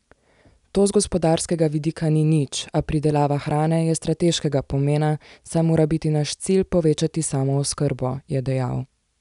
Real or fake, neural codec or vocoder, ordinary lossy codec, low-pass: real; none; none; 10.8 kHz